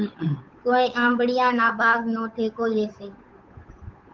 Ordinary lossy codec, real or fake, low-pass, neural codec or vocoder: Opus, 32 kbps; fake; 7.2 kHz; codec, 16 kHz, 8 kbps, FunCodec, trained on Chinese and English, 25 frames a second